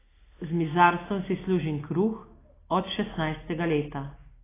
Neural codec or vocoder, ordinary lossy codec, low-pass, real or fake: none; AAC, 16 kbps; 3.6 kHz; real